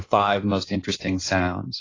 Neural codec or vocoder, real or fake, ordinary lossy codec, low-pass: codec, 16 kHz in and 24 kHz out, 1.1 kbps, FireRedTTS-2 codec; fake; AAC, 32 kbps; 7.2 kHz